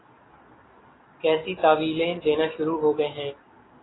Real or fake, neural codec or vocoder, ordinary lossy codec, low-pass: real; none; AAC, 16 kbps; 7.2 kHz